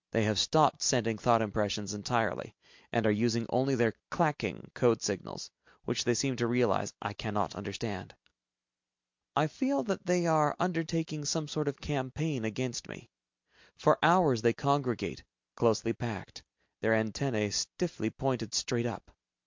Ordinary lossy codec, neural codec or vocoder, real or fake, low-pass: MP3, 64 kbps; none; real; 7.2 kHz